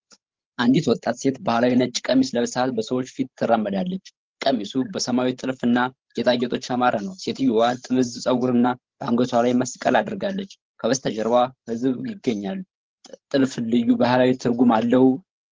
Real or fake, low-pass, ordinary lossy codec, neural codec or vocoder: fake; 7.2 kHz; Opus, 16 kbps; codec, 16 kHz, 16 kbps, FreqCodec, larger model